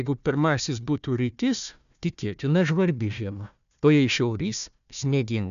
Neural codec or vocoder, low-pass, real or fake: codec, 16 kHz, 1 kbps, FunCodec, trained on Chinese and English, 50 frames a second; 7.2 kHz; fake